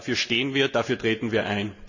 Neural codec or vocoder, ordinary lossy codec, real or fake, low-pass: none; none; real; 7.2 kHz